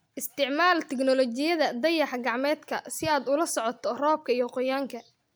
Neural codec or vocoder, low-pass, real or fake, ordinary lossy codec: none; none; real; none